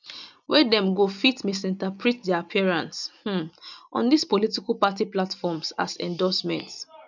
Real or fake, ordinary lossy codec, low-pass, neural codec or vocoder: real; none; 7.2 kHz; none